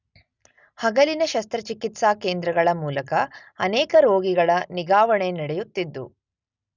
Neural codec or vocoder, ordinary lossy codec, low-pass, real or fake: none; none; 7.2 kHz; real